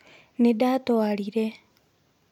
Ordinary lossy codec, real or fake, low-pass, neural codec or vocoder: none; real; 19.8 kHz; none